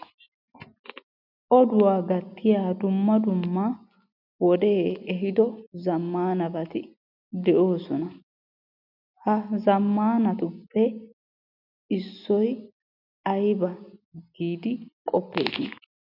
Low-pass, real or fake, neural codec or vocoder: 5.4 kHz; real; none